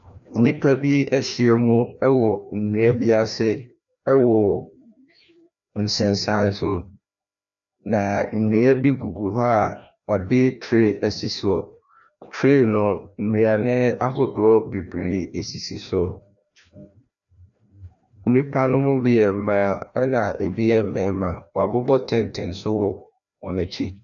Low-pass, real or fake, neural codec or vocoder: 7.2 kHz; fake; codec, 16 kHz, 1 kbps, FreqCodec, larger model